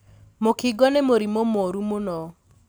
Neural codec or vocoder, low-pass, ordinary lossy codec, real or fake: none; none; none; real